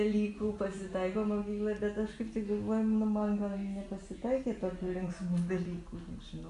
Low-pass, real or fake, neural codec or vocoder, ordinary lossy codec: 10.8 kHz; real; none; AAC, 64 kbps